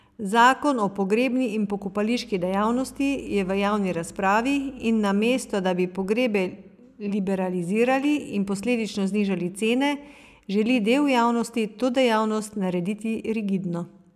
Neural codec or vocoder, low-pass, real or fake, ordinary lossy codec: none; 14.4 kHz; real; none